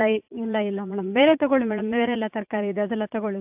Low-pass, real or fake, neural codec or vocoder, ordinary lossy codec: 3.6 kHz; fake; vocoder, 44.1 kHz, 80 mel bands, Vocos; AAC, 32 kbps